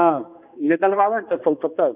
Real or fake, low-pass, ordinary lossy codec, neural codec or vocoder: fake; 3.6 kHz; none; codec, 16 kHz, 2 kbps, X-Codec, HuBERT features, trained on balanced general audio